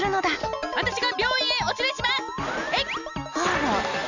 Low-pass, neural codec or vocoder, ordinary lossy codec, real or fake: 7.2 kHz; none; none; real